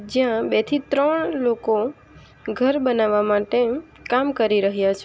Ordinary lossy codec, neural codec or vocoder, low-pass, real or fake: none; none; none; real